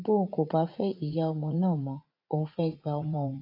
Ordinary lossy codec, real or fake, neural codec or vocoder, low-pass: AAC, 32 kbps; fake; vocoder, 44.1 kHz, 80 mel bands, Vocos; 5.4 kHz